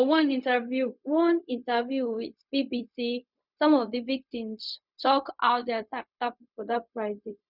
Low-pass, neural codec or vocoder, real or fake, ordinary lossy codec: 5.4 kHz; codec, 16 kHz, 0.4 kbps, LongCat-Audio-Codec; fake; none